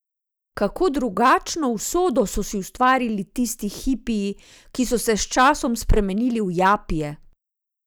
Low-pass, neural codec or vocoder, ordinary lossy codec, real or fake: none; none; none; real